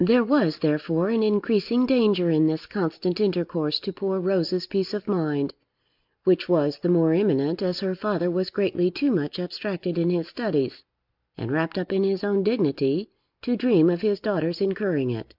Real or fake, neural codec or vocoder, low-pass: real; none; 5.4 kHz